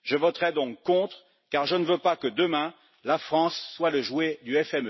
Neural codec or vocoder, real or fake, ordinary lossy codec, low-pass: none; real; MP3, 24 kbps; 7.2 kHz